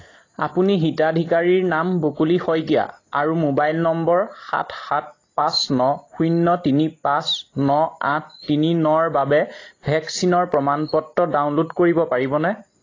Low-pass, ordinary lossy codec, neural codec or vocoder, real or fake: 7.2 kHz; AAC, 32 kbps; none; real